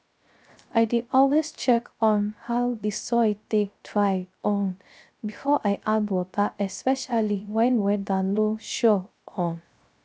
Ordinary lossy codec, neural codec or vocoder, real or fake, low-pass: none; codec, 16 kHz, 0.3 kbps, FocalCodec; fake; none